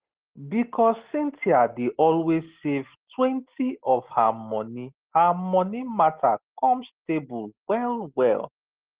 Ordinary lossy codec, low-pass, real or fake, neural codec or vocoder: Opus, 16 kbps; 3.6 kHz; real; none